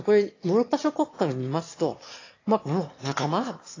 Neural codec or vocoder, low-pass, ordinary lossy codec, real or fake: autoencoder, 22.05 kHz, a latent of 192 numbers a frame, VITS, trained on one speaker; 7.2 kHz; AAC, 32 kbps; fake